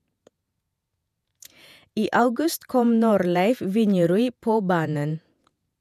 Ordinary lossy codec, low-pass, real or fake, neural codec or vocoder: none; 14.4 kHz; fake; vocoder, 48 kHz, 128 mel bands, Vocos